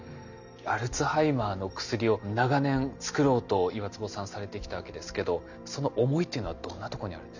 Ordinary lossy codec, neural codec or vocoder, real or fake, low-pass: none; none; real; 7.2 kHz